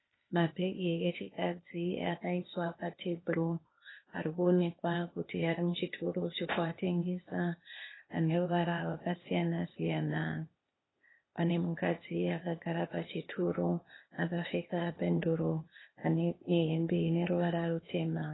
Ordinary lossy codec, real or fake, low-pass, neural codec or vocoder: AAC, 16 kbps; fake; 7.2 kHz; codec, 16 kHz, 0.8 kbps, ZipCodec